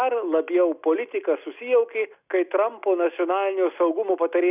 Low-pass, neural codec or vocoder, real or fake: 3.6 kHz; none; real